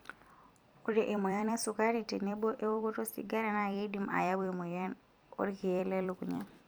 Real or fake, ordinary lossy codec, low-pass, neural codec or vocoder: fake; none; none; vocoder, 44.1 kHz, 128 mel bands every 256 samples, BigVGAN v2